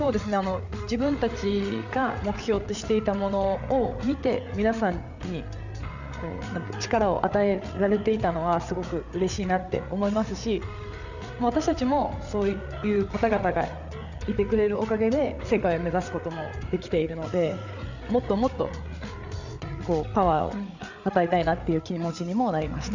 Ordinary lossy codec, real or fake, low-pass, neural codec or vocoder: none; fake; 7.2 kHz; codec, 16 kHz, 8 kbps, FreqCodec, larger model